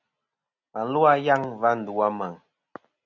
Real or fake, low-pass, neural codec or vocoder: real; 7.2 kHz; none